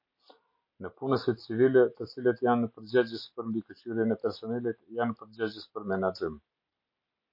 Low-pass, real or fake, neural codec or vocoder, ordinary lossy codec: 5.4 kHz; real; none; MP3, 32 kbps